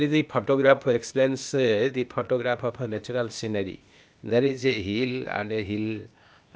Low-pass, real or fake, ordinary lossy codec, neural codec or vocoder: none; fake; none; codec, 16 kHz, 0.8 kbps, ZipCodec